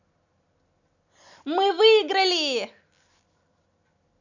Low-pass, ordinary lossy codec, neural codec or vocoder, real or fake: 7.2 kHz; none; none; real